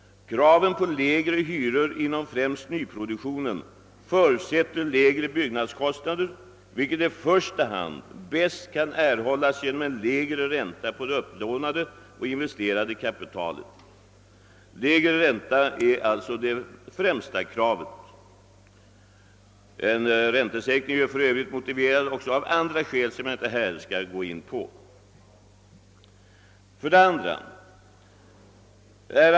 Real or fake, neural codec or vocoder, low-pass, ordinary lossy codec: real; none; none; none